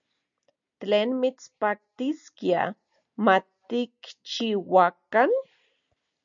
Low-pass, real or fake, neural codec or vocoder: 7.2 kHz; real; none